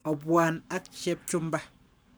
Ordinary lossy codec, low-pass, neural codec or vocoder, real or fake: none; none; codec, 44.1 kHz, 7.8 kbps, Pupu-Codec; fake